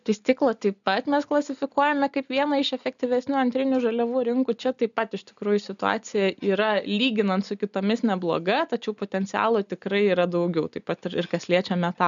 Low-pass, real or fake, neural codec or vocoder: 7.2 kHz; real; none